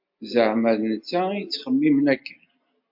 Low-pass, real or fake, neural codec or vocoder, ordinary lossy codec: 5.4 kHz; real; none; MP3, 48 kbps